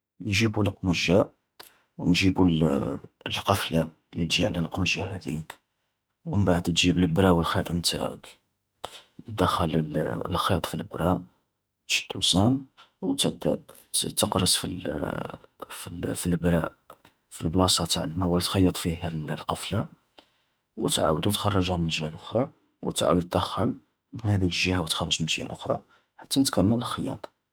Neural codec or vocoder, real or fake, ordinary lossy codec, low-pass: autoencoder, 48 kHz, 32 numbers a frame, DAC-VAE, trained on Japanese speech; fake; none; none